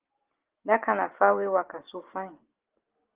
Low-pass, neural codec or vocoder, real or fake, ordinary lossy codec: 3.6 kHz; none; real; Opus, 16 kbps